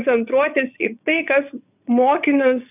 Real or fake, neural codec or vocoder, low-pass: fake; vocoder, 22.05 kHz, 80 mel bands, WaveNeXt; 3.6 kHz